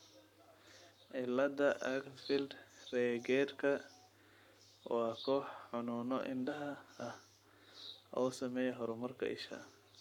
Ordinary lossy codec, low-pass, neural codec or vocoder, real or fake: none; 19.8 kHz; codec, 44.1 kHz, 7.8 kbps, Pupu-Codec; fake